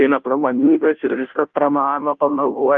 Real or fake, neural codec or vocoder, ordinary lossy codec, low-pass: fake; codec, 16 kHz, 0.5 kbps, FunCodec, trained on Chinese and English, 25 frames a second; Opus, 32 kbps; 7.2 kHz